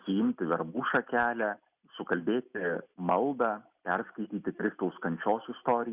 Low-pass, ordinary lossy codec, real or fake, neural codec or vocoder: 3.6 kHz; Opus, 64 kbps; real; none